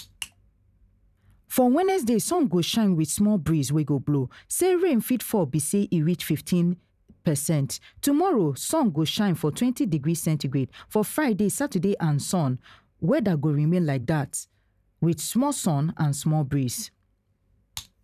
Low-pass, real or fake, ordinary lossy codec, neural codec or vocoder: 14.4 kHz; fake; none; vocoder, 44.1 kHz, 128 mel bands every 512 samples, BigVGAN v2